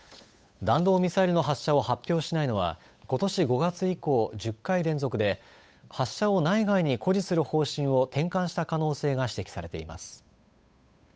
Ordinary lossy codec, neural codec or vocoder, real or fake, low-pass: none; codec, 16 kHz, 8 kbps, FunCodec, trained on Chinese and English, 25 frames a second; fake; none